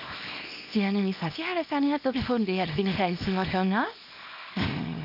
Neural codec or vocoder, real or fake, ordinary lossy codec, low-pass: codec, 24 kHz, 0.9 kbps, WavTokenizer, small release; fake; AAC, 48 kbps; 5.4 kHz